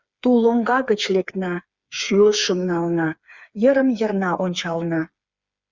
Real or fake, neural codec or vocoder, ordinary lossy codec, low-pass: fake; codec, 16 kHz, 4 kbps, FreqCodec, smaller model; Opus, 64 kbps; 7.2 kHz